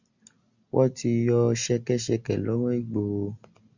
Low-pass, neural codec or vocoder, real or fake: 7.2 kHz; none; real